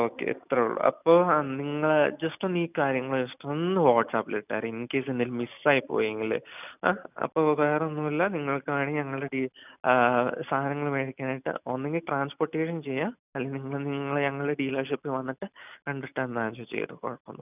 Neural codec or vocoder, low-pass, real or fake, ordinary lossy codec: none; 3.6 kHz; real; none